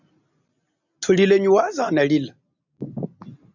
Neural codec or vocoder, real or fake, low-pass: none; real; 7.2 kHz